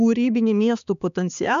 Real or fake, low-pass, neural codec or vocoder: fake; 7.2 kHz; codec, 16 kHz, 4 kbps, X-Codec, HuBERT features, trained on balanced general audio